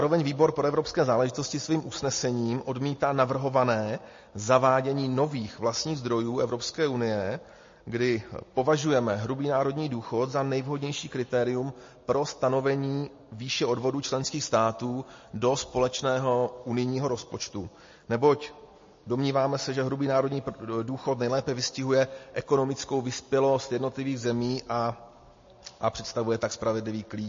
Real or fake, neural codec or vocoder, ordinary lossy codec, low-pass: real; none; MP3, 32 kbps; 7.2 kHz